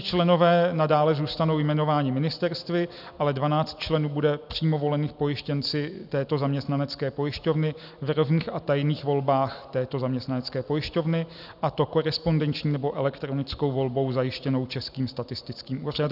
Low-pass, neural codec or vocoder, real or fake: 5.4 kHz; none; real